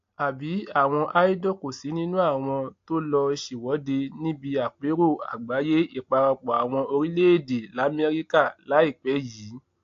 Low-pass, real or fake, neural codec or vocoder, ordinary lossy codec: 7.2 kHz; real; none; AAC, 48 kbps